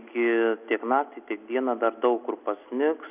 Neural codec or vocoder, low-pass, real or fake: none; 3.6 kHz; real